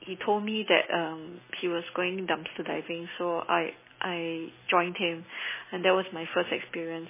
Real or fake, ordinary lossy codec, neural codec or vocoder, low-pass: real; MP3, 16 kbps; none; 3.6 kHz